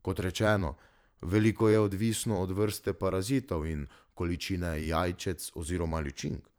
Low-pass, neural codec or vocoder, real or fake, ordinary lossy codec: none; vocoder, 44.1 kHz, 128 mel bands every 512 samples, BigVGAN v2; fake; none